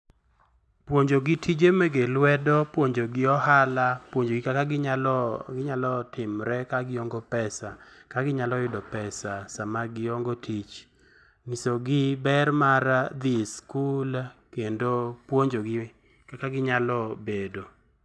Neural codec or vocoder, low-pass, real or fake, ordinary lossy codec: none; none; real; none